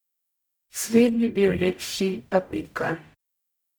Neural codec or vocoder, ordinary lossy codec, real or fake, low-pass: codec, 44.1 kHz, 0.9 kbps, DAC; none; fake; none